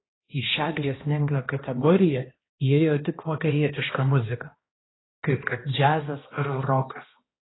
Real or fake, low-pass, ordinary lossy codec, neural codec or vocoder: fake; 7.2 kHz; AAC, 16 kbps; codec, 16 kHz, 1 kbps, X-Codec, HuBERT features, trained on balanced general audio